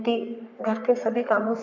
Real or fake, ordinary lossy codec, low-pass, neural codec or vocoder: fake; none; 7.2 kHz; codec, 44.1 kHz, 3.4 kbps, Pupu-Codec